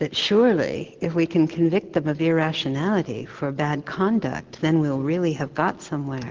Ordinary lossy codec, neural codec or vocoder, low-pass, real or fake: Opus, 16 kbps; none; 7.2 kHz; real